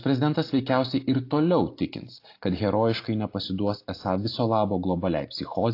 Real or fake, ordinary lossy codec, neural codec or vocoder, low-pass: real; AAC, 32 kbps; none; 5.4 kHz